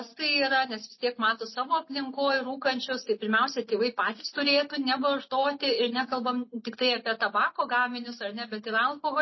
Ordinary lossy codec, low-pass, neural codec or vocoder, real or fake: MP3, 24 kbps; 7.2 kHz; none; real